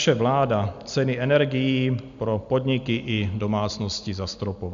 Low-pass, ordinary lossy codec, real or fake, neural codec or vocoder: 7.2 kHz; MP3, 64 kbps; real; none